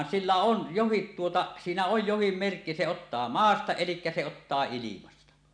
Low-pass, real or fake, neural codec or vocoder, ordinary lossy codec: 9.9 kHz; real; none; none